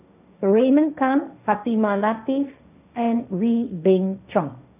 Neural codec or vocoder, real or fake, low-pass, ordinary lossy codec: codec, 16 kHz, 1.1 kbps, Voila-Tokenizer; fake; 3.6 kHz; none